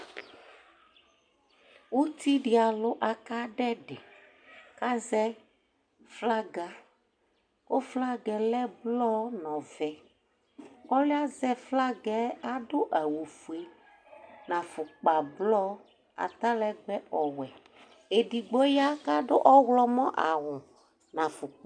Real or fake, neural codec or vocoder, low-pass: real; none; 9.9 kHz